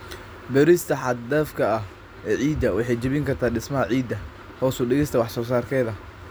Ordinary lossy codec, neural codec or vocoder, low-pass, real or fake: none; none; none; real